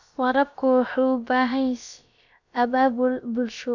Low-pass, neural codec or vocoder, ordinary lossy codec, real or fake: 7.2 kHz; codec, 16 kHz, about 1 kbps, DyCAST, with the encoder's durations; none; fake